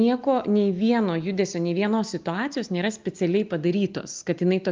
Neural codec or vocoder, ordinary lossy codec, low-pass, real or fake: none; Opus, 24 kbps; 7.2 kHz; real